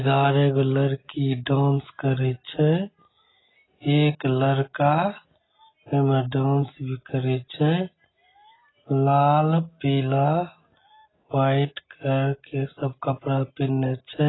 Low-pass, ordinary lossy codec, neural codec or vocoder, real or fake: 7.2 kHz; AAC, 16 kbps; none; real